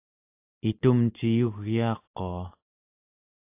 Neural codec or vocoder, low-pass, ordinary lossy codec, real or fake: none; 3.6 kHz; AAC, 32 kbps; real